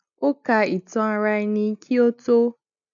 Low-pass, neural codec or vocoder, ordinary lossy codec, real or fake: 7.2 kHz; none; none; real